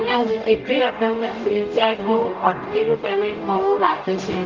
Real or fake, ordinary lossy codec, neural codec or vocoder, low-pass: fake; Opus, 32 kbps; codec, 44.1 kHz, 0.9 kbps, DAC; 7.2 kHz